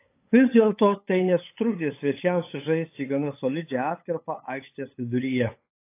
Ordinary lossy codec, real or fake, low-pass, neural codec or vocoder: AAC, 24 kbps; fake; 3.6 kHz; codec, 16 kHz, 8 kbps, FunCodec, trained on LibriTTS, 25 frames a second